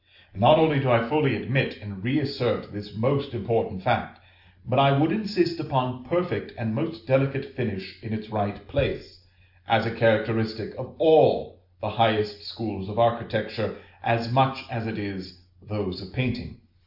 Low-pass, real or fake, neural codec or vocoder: 5.4 kHz; real; none